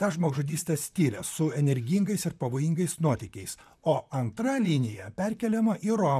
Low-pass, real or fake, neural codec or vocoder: 14.4 kHz; fake; vocoder, 44.1 kHz, 128 mel bands, Pupu-Vocoder